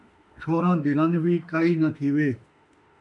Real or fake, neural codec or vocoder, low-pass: fake; autoencoder, 48 kHz, 32 numbers a frame, DAC-VAE, trained on Japanese speech; 10.8 kHz